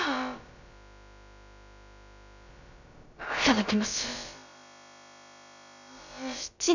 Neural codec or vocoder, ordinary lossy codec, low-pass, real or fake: codec, 16 kHz, about 1 kbps, DyCAST, with the encoder's durations; none; 7.2 kHz; fake